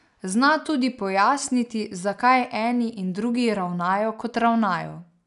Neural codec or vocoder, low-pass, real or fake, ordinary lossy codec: none; 10.8 kHz; real; none